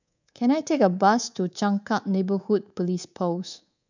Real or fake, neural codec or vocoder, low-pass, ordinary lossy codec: fake; codec, 24 kHz, 3.1 kbps, DualCodec; 7.2 kHz; none